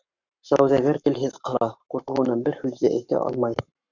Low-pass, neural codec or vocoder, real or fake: 7.2 kHz; codec, 44.1 kHz, 7.8 kbps, Pupu-Codec; fake